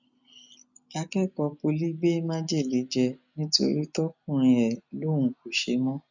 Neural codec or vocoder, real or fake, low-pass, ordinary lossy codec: none; real; 7.2 kHz; none